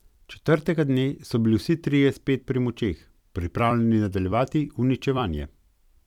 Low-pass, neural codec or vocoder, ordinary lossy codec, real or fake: 19.8 kHz; vocoder, 44.1 kHz, 128 mel bands every 256 samples, BigVGAN v2; none; fake